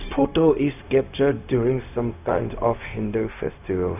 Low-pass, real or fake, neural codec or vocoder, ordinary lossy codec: 3.6 kHz; fake; codec, 16 kHz, 0.4 kbps, LongCat-Audio-Codec; none